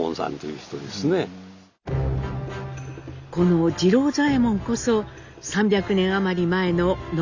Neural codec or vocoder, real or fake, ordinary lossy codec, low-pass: none; real; none; 7.2 kHz